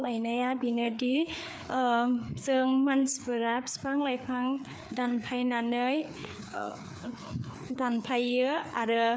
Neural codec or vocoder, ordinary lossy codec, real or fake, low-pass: codec, 16 kHz, 4 kbps, FreqCodec, larger model; none; fake; none